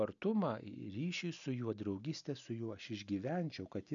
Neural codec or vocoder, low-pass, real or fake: none; 7.2 kHz; real